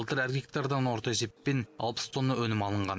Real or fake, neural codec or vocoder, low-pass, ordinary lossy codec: real; none; none; none